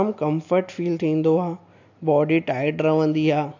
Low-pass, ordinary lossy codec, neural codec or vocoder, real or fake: 7.2 kHz; AAC, 48 kbps; none; real